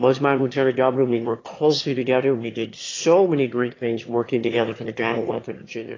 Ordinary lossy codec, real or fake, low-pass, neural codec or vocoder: AAC, 32 kbps; fake; 7.2 kHz; autoencoder, 22.05 kHz, a latent of 192 numbers a frame, VITS, trained on one speaker